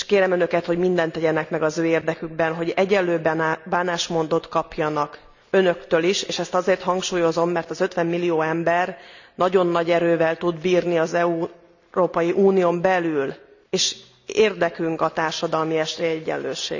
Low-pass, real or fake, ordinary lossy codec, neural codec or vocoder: 7.2 kHz; real; none; none